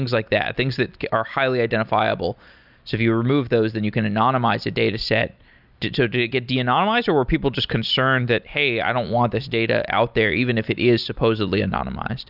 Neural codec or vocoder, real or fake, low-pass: none; real; 5.4 kHz